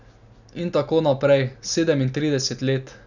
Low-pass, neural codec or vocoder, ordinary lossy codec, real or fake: 7.2 kHz; none; none; real